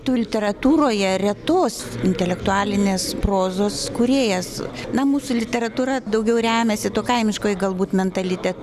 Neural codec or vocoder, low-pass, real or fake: none; 14.4 kHz; real